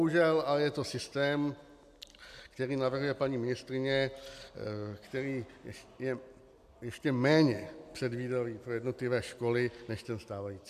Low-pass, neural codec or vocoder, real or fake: 14.4 kHz; none; real